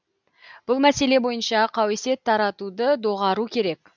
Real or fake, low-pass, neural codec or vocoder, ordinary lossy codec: real; 7.2 kHz; none; none